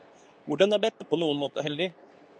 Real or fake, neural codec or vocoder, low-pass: fake; codec, 24 kHz, 0.9 kbps, WavTokenizer, medium speech release version 2; 9.9 kHz